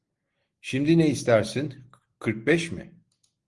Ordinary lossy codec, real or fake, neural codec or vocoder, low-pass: Opus, 24 kbps; real; none; 10.8 kHz